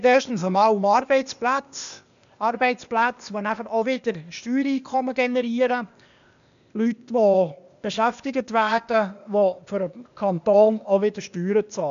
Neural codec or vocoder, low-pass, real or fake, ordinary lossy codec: codec, 16 kHz, 0.8 kbps, ZipCodec; 7.2 kHz; fake; MP3, 96 kbps